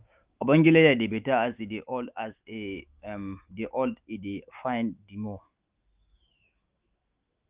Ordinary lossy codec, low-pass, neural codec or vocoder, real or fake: Opus, 24 kbps; 3.6 kHz; autoencoder, 48 kHz, 128 numbers a frame, DAC-VAE, trained on Japanese speech; fake